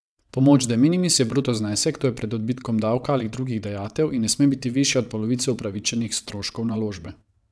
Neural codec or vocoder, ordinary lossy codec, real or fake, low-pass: vocoder, 22.05 kHz, 80 mel bands, WaveNeXt; none; fake; none